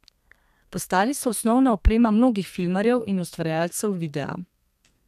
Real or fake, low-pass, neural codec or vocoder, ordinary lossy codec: fake; 14.4 kHz; codec, 32 kHz, 1.9 kbps, SNAC; none